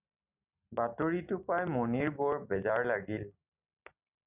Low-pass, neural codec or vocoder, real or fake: 3.6 kHz; none; real